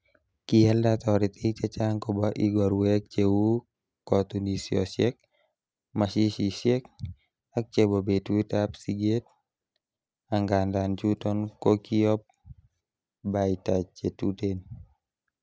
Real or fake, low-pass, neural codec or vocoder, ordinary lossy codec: real; none; none; none